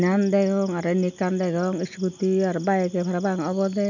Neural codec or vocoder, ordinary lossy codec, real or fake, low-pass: none; none; real; 7.2 kHz